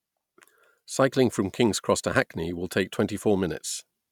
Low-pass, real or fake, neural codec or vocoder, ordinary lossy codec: 19.8 kHz; real; none; none